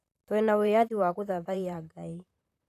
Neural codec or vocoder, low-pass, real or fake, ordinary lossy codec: vocoder, 44.1 kHz, 128 mel bands, Pupu-Vocoder; 14.4 kHz; fake; none